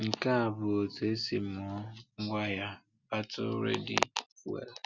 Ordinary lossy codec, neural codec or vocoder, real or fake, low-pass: Opus, 64 kbps; none; real; 7.2 kHz